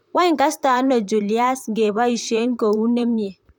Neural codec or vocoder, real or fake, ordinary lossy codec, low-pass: vocoder, 44.1 kHz, 128 mel bands, Pupu-Vocoder; fake; none; 19.8 kHz